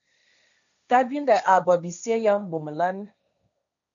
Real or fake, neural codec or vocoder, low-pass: fake; codec, 16 kHz, 1.1 kbps, Voila-Tokenizer; 7.2 kHz